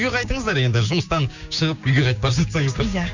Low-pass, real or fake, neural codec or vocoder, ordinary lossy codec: 7.2 kHz; fake; codec, 16 kHz, 6 kbps, DAC; Opus, 64 kbps